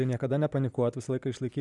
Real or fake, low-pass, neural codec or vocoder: real; 10.8 kHz; none